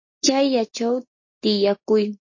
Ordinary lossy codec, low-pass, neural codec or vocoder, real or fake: MP3, 32 kbps; 7.2 kHz; none; real